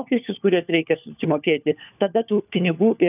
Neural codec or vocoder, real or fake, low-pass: codec, 16 kHz, 4 kbps, FunCodec, trained on LibriTTS, 50 frames a second; fake; 3.6 kHz